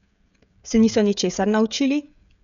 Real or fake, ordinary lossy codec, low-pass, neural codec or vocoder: fake; none; 7.2 kHz; codec, 16 kHz, 16 kbps, FreqCodec, smaller model